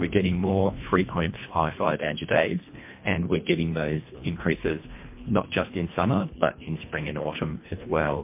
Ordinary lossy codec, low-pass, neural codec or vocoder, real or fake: MP3, 24 kbps; 3.6 kHz; codec, 24 kHz, 1.5 kbps, HILCodec; fake